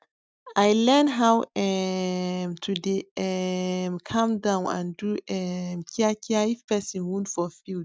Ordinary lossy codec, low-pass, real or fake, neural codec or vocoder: none; none; real; none